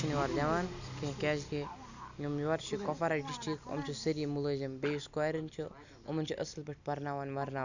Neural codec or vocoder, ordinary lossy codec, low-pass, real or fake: none; none; 7.2 kHz; real